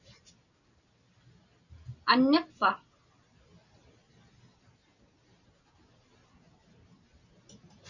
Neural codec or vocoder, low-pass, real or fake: none; 7.2 kHz; real